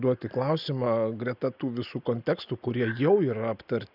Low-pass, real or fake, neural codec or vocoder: 5.4 kHz; real; none